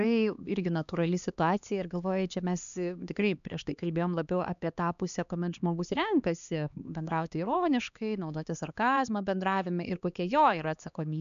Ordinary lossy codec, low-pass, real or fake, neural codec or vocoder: MP3, 96 kbps; 7.2 kHz; fake; codec, 16 kHz, 2 kbps, X-Codec, HuBERT features, trained on LibriSpeech